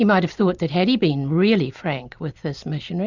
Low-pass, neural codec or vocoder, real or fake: 7.2 kHz; none; real